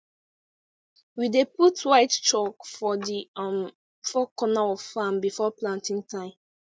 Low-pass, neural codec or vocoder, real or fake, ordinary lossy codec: none; none; real; none